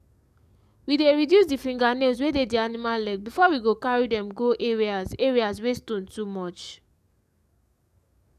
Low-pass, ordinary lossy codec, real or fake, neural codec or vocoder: 14.4 kHz; none; fake; codec, 44.1 kHz, 7.8 kbps, DAC